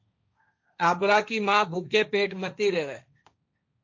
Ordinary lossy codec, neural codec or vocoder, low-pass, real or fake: MP3, 48 kbps; codec, 16 kHz, 1.1 kbps, Voila-Tokenizer; 7.2 kHz; fake